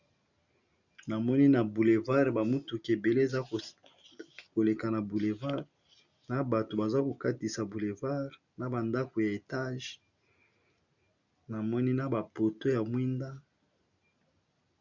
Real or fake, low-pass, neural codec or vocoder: real; 7.2 kHz; none